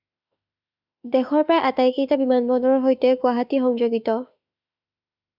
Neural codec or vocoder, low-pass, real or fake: autoencoder, 48 kHz, 32 numbers a frame, DAC-VAE, trained on Japanese speech; 5.4 kHz; fake